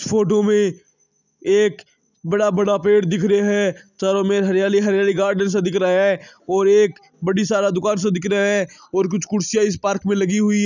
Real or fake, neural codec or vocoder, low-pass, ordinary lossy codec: real; none; 7.2 kHz; none